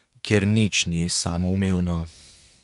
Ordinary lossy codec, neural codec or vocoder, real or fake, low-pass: none; codec, 24 kHz, 1 kbps, SNAC; fake; 10.8 kHz